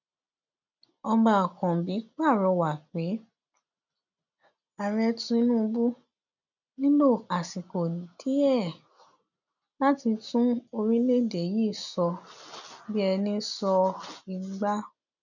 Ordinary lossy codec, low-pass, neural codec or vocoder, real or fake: none; 7.2 kHz; none; real